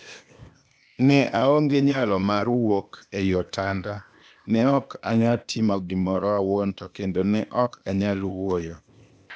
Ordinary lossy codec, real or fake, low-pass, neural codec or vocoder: none; fake; none; codec, 16 kHz, 0.8 kbps, ZipCodec